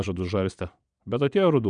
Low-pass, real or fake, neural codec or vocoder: 9.9 kHz; fake; vocoder, 22.05 kHz, 80 mel bands, Vocos